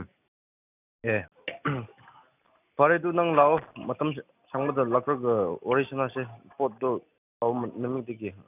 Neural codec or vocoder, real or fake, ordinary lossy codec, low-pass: none; real; none; 3.6 kHz